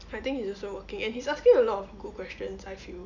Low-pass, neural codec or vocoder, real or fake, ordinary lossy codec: 7.2 kHz; none; real; none